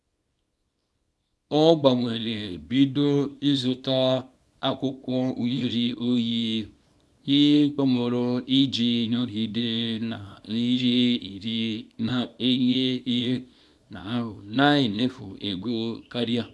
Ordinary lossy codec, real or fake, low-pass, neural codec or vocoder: none; fake; none; codec, 24 kHz, 0.9 kbps, WavTokenizer, small release